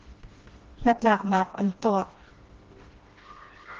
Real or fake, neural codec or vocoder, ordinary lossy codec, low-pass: fake; codec, 16 kHz, 1 kbps, FreqCodec, smaller model; Opus, 16 kbps; 7.2 kHz